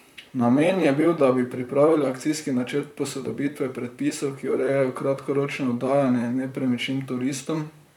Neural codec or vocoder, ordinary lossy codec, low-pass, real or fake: vocoder, 44.1 kHz, 128 mel bands, Pupu-Vocoder; none; 19.8 kHz; fake